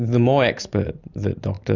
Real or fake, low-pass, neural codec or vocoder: real; 7.2 kHz; none